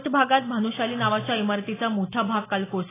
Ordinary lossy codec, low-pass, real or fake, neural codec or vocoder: AAC, 16 kbps; 3.6 kHz; real; none